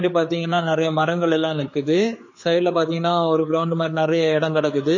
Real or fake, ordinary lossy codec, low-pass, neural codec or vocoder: fake; MP3, 32 kbps; 7.2 kHz; codec, 16 kHz, 4 kbps, X-Codec, HuBERT features, trained on general audio